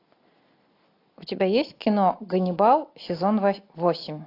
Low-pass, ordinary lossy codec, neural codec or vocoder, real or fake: 5.4 kHz; AAC, 32 kbps; none; real